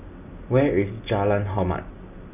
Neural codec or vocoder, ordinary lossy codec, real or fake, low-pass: none; none; real; 3.6 kHz